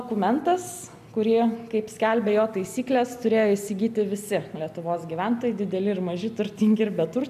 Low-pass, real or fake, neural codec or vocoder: 14.4 kHz; real; none